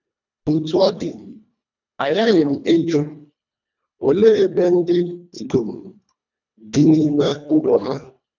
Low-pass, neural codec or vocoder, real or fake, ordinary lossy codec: 7.2 kHz; codec, 24 kHz, 1.5 kbps, HILCodec; fake; none